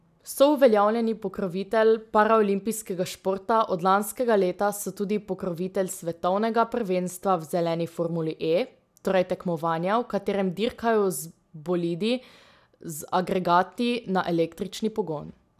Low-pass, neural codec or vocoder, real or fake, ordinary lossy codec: 14.4 kHz; none; real; none